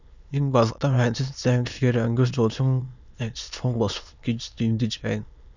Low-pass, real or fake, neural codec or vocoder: 7.2 kHz; fake; autoencoder, 22.05 kHz, a latent of 192 numbers a frame, VITS, trained on many speakers